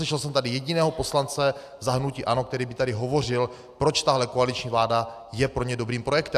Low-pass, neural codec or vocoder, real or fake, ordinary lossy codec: 14.4 kHz; none; real; AAC, 96 kbps